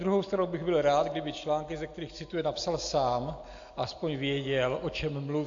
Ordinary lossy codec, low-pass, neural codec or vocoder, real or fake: AAC, 48 kbps; 7.2 kHz; none; real